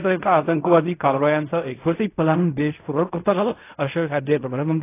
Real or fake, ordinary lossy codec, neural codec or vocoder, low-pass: fake; AAC, 24 kbps; codec, 16 kHz in and 24 kHz out, 0.4 kbps, LongCat-Audio-Codec, fine tuned four codebook decoder; 3.6 kHz